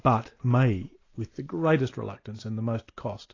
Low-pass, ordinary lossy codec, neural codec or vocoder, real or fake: 7.2 kHz; AAC, 32 kbps; none; real